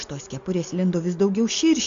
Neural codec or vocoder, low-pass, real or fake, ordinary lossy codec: none; 7.2 kHz; real; AAC, 64 kbps